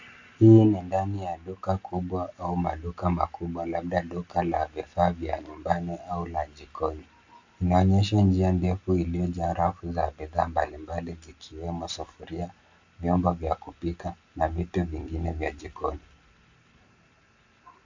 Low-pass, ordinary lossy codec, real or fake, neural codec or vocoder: 7.2 kHz; AAC, 48 kbps; real; none